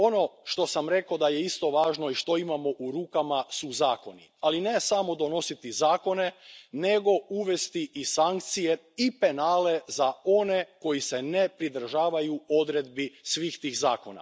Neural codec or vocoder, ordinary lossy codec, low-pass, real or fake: none; none; none; real